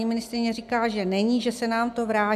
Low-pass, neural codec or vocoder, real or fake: 14.4 kHz; none; real